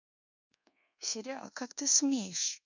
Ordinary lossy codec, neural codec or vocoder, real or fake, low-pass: none; codec, 24 kHz, 1.2 kbps, DualCodec; fake; 7.2 kHz